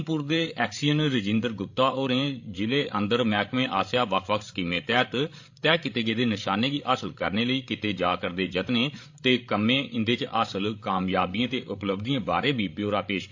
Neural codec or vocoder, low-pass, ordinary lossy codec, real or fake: codec, 16 kHz, 16 kbps, FreqCodec, larger model; 7.2 kHz; AAC, 48 kbps; fake